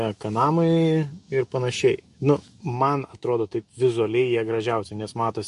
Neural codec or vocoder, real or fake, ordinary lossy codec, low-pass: autoencoder, 48 kHz, 128 numbers a frame, DAC-VAE, trained on Japanese speech; fake; MP3, 48 kbps; 14.4 kHz